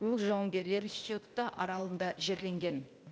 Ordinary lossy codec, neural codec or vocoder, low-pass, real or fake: none; codec, 16 kHz, 0.8 kbps, ZipCodec; none; fake